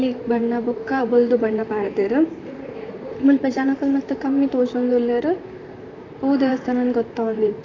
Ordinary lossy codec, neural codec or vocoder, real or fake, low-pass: AAC, 32 kbps; vocoder, 44.1 kHz, 128 mel bands, Pupu-Vocoder; fake; 7.2 kHz